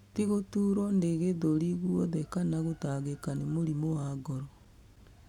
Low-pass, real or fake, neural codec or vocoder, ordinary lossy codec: 19.8 kHz; real; none; none